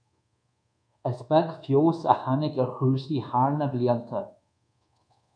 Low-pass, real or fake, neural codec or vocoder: 9.9 kHz; fake; codec, 24 kHz, 1.2 kbps, DualCodec